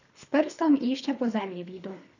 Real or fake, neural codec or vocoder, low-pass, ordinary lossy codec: fake; codec, 24 kHz, 3 kbps, HILCodec; 7.2 kHz; none